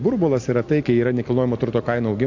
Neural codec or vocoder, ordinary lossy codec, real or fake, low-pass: none; AAC, 48 kbps; real; 7.2 kHz